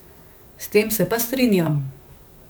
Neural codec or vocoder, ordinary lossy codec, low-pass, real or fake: codec, 44.1 kHz, 7.8 kbps, DAC; none; none; fake